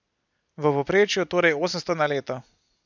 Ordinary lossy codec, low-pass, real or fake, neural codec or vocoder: none; 7.2 kHz; real; none